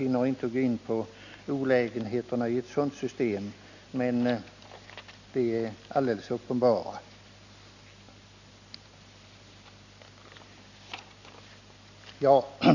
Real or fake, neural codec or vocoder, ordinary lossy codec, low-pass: real; none; none; 7.2 kHz